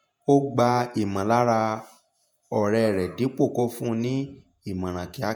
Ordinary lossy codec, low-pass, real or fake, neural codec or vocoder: none; none; fake; vocoder, 48 kHz, 128 mel bands, Vocos